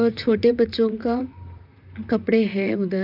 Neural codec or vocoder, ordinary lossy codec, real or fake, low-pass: vocoder, 44.1 kHz, 128 mel bands, Pupu-Vocoder; none; fake; 5.4 kHz